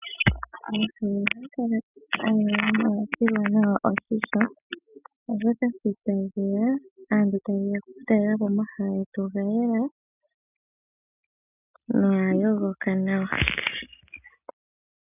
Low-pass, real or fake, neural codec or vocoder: 3.6 kHz; real; none